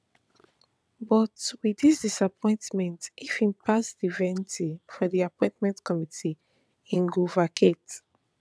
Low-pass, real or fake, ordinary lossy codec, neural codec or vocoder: none; fake; none; vocoder, 22.05 kHz, 80 mel bands, WaveNeXt